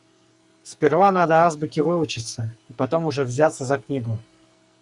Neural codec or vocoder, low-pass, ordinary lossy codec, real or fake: codec, 44.1 kHz, 2.6 kbps, SNAC; 10.8 kHz; Opus, 64 kbps; fake